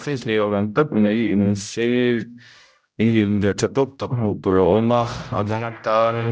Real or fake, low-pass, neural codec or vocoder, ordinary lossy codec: fake; none; codec, 16 kHz, 0.5 kbps, X-Codec, HuBERT features, trained on general audio; none